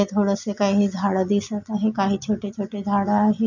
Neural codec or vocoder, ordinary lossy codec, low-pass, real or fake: none; none; 7.2 kHz; real